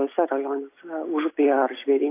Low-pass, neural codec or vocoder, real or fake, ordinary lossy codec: 3.6 kHz; none; real; AAC, 24 kbps